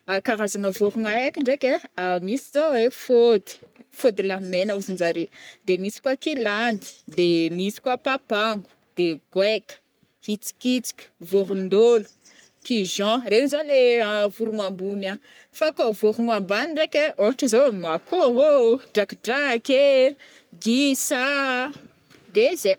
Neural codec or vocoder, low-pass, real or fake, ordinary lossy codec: codec, 44.1 kHz, 3.4 kbps, Pupu-Codec; none; fake; none